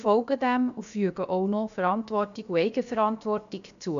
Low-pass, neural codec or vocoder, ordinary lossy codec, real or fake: 7.2 kHz; codec, 16 kHz, about 1 kbps, DyCAST, with the encoder's durations; none; fake